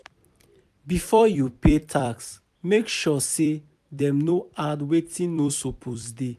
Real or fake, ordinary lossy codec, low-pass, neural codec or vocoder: fake; AAC, 96 kbps; 14.4 kHz; vocoder, 44.1 kHz, 128 mel bands every 256 samples, BigVGAN v2